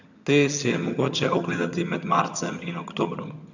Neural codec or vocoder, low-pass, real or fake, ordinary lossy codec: vocoder, 22.05 kHz, 80 mel bands, HiFi-GAN; 7.2 kHz; fake; none